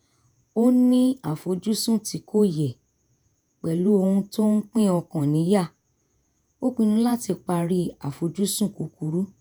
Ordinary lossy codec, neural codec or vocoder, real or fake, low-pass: none; vocoder, 48 kHz, 128 mel bands, Vocos; fake; none